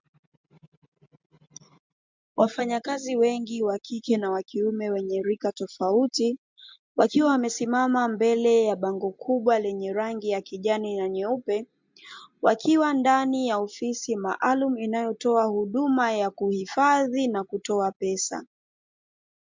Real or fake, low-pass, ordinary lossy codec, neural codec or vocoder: real; 7.2 kHz; MP3, 64 kbps; none